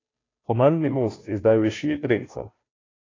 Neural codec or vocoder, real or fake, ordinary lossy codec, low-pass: codec, 16 kHz, 0.5 kbps, FunCodec, trained on Chinese and English, 25 frames a second; fake; AAC, 32 kbps; 7.2 kHz